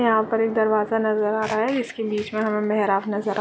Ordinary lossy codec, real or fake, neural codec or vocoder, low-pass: none; real; none; none